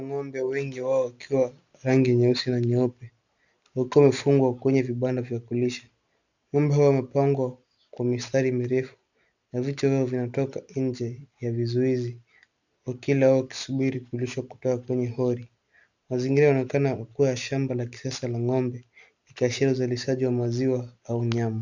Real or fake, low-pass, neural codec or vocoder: real; 7.2 kHz; none